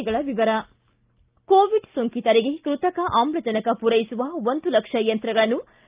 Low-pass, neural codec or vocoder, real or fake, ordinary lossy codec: 3.6 kHz; none; real; Opus, 24 kbps